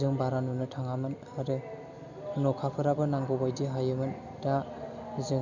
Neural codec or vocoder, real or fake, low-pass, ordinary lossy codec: none; real; 7.2 kHz; none